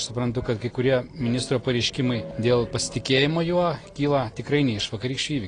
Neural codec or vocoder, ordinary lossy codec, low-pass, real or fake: none; AAC, 32 kbps; 9.9 kHz; real